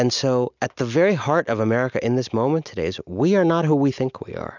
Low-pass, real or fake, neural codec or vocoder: 7.2 kHz; real; none